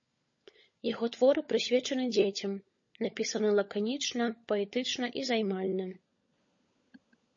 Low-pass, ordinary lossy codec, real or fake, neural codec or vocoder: 7.2 kHz; MP3, 32 kbps; fake; codec, 16 kHz, 16 kbps, FunCodec, trained on LibriTTS, 50 frames a second